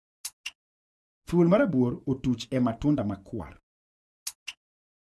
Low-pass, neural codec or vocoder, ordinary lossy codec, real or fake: none; vocoder, 24 kHz, 100 mel bands, Vocos; none; fake